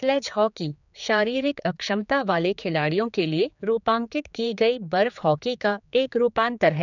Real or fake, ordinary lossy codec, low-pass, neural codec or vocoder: fake; none; 7.2 kHz; codec, 16 kHz, 4 kbps, X-Codec, HuBERT features, trained on general audio